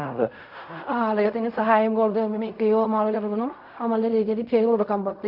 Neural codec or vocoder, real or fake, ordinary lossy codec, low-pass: codec, 16 kHz in and 24 kHz out, 0.4 kbps, LongCat-Audio-Codec, fine tuned four codebook decoder; fake; none; 5.4 kHz